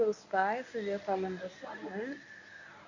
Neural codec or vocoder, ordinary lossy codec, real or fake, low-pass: codec, 24 kHz, 0.9 kbps, WavTokenizer, medium speech release version 1; none; fake; 7.2 kHz